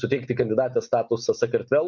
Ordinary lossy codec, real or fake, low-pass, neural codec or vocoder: MP3, 64 kbps; real; 7.2 kHz; none